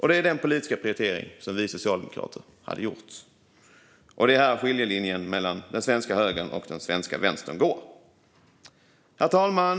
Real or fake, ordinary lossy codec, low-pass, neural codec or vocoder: real; none; none; none